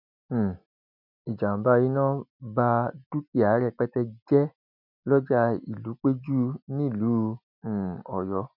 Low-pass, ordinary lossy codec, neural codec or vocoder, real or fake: 5.4 kHz; none; none; real